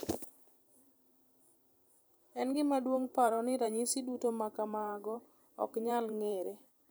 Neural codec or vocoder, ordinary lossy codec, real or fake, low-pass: vocoder, 44.1 kHz, 128 mel bands every 512 samples, BigVGAN v2; none; fake; none